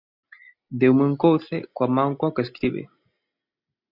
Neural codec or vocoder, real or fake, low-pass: none; real; 5.4 kHz